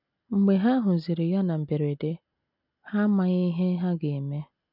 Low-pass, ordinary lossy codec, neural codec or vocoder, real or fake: 5.4 kHz; none; none; real